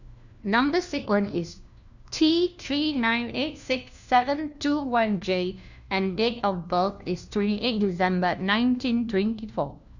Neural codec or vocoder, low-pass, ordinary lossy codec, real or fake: codec, 16 kHz, 1 kbps, FunCodec, trained on LibriTTS, 50 frames a second; 7.2 kHz; none; fake